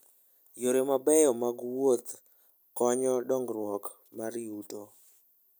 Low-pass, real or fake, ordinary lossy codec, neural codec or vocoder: none; real; none; none